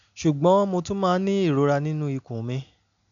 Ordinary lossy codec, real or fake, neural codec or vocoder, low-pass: none; real; none; 7.2 kHz